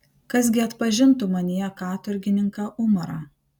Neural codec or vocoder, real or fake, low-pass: none; real; 19.8 kHz